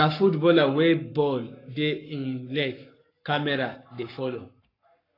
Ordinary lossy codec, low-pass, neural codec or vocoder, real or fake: AAC, 32 kbps; 5.4 kHz; codec, 44.1 kHz, 7.8 kbps, Pupu-Codec; fake